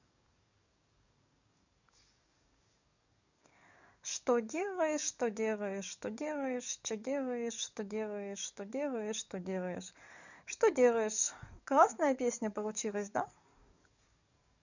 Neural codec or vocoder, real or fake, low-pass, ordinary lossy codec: codec, 44.1 kHz, 7.8 kbps, DAC; fake; 7.2 kHz; none